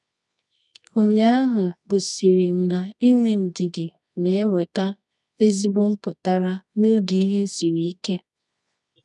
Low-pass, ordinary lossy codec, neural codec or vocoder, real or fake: 10.8 kHz; none; codec, 24 kHz, 0.9 kbps, WavTokenizer, medium music audio release; fake